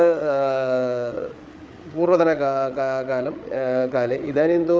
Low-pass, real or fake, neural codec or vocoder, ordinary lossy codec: none; fake; codec, 16 kHz, 8 kbps, FreqCodec, larger model; none